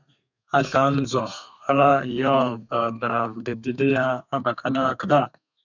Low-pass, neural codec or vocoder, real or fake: 7.2 kHz; codec, 32 kHz, 1.9 kbps, SNAC; fake